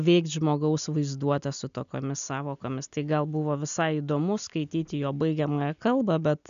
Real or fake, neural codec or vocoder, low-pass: real; none; 7.2 kHz